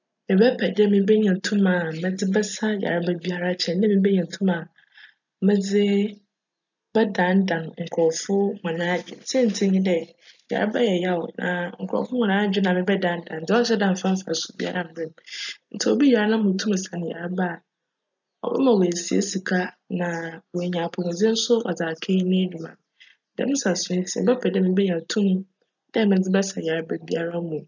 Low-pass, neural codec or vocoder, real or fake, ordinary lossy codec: 7.2 kHz; none; real; none